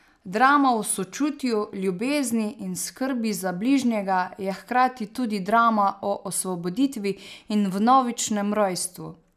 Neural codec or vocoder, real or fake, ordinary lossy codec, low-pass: none; real; none; 14.4 kHz